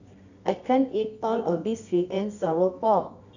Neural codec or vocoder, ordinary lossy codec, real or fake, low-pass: codec, 24 kHz, 0.9 kbps, WavTokenizer, medium music audio release; none; fake; 7.2 kHz